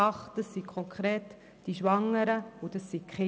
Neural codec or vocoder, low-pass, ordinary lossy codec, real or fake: none; none; none; real